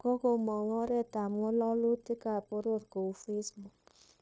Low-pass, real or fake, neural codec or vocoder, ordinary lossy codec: none; fake; codec, 16 kHz, 0.9 kbps, LongCat-Audio-Codec; none